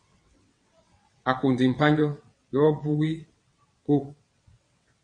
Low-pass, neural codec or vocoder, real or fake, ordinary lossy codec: 9.9 kHz; vocoder, 22.05 kHz, 80 mel bands, WaveNeXt; fake; MP3, 48 kbps